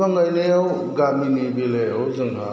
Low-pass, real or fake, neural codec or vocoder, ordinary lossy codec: none; real; none; none